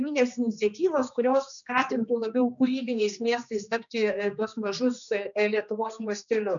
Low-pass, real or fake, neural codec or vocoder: 7.2 kHz; fake; codec, 16 kHz, 2 kbps, X-Codec, HuBERT features, trained on general audio